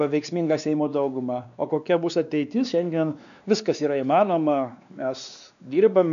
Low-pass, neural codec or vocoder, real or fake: 7.2 kHz; codec, 16 kHz, 2 kbps, X-Codec, WavLM features, trained on Multilingual LibriSpeech; fake